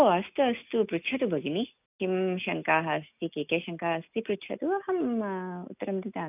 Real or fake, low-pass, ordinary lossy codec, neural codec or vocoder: real; 3.6 kHz; none; none